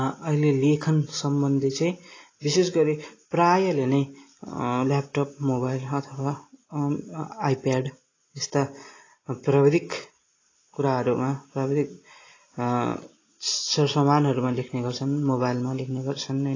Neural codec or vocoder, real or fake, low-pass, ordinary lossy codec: none; real; 7.2 kHz; AAC, 32 kbps